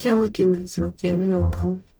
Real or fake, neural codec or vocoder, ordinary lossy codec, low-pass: fake; codec, 44.1 kHz, 0.9 kbps, DAC; none; none